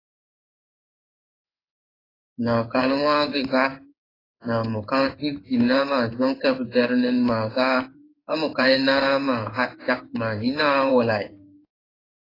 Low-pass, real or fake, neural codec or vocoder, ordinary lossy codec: 5.4 kHz; fake; codec, 16 kHz in and 24 kHz out, 1 kbps, XY-Tokenizer; AAC, 24 kbps